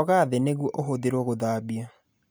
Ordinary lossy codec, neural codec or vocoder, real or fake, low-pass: none; none; real; none